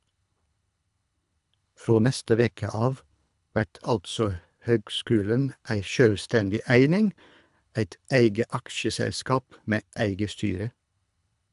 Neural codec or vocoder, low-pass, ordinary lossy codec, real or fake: codec, 24 kHz, 3 kbps, HILCodec; 10.8 kHz; AAC, 96 kbps; fake